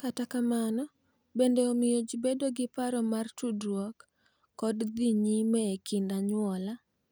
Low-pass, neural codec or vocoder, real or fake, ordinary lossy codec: none; none; real; none